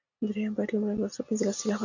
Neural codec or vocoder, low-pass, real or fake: none; 7.2 kHz; real